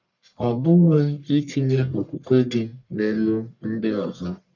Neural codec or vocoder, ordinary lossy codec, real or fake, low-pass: codec, 44.1 kHz, 1.7 kbps, Pupu-Codec; none; fake; 7.2 kHz